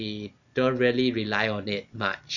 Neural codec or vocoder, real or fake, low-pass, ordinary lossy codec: none; real; 7.2 kHz; none